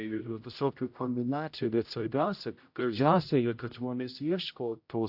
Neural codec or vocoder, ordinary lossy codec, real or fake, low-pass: codec, 16 kHz, 0.5 kbps, X-Codec, HuBERT features, trained on general audio; AAC, 48 kbps; fake; 5.4 kHz